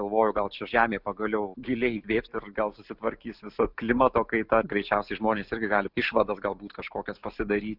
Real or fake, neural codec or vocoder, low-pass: real; none; 5.4 kHz